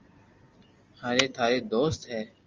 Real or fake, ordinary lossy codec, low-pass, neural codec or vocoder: real; Opus, 32 kbps; 7.2 kHz; none